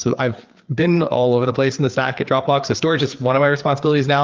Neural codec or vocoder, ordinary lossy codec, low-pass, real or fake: codec, 16 kHz, 4 kbps, FreqCodec, larger model; Opus, 24 kbps; 7.2 kHz; fake